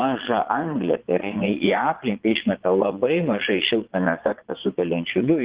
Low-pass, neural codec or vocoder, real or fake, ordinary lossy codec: 3.6 kHz; vocoder, 22.05 kHz, 80 mel bands, Vocos; fake; Opus, 16 kbps